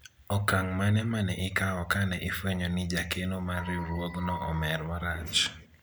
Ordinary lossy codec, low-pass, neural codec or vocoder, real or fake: none; none; none; real